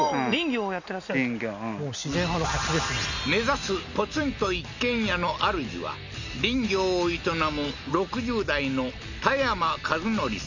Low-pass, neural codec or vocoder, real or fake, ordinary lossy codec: 7.2 kHz; none; real; none